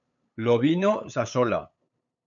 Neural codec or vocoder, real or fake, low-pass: codec, 16 kHz, 8 kbps, FunCodec, trained on LibriTTS, 25 frames a second; fake; 7.2 kHz